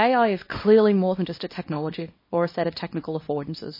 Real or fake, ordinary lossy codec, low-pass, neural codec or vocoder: fake; MP3, 24 kbps; 5.4 kHz; codec, 24 kHz, 0.9 kbps, WavTokenizer, small release